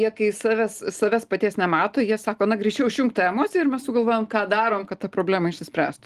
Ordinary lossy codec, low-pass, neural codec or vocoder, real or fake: Opus, 24 kbps; 14.4 kHz; none; real